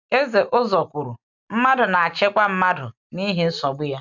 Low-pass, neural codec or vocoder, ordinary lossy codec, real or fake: 7.2 kHz; none; none; real